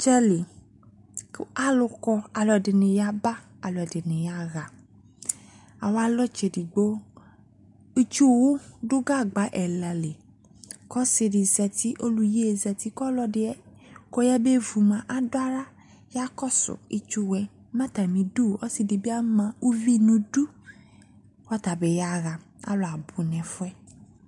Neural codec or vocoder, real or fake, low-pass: none; real; 10.8 kHz